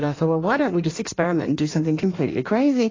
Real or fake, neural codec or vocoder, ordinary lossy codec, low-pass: fake; codec, 16 kHz in and 24 kHz out, 1.1 kbps, FireRedTTS-2 codec; AAC, 32 kbps; 7.2 kHz